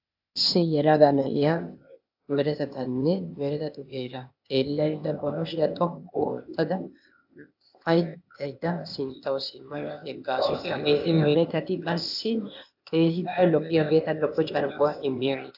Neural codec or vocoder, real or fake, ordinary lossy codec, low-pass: codec, 16 kHz, 0.8 kbps, ZipCodec; fake; AAC, 48 kbps; 5.4 kHz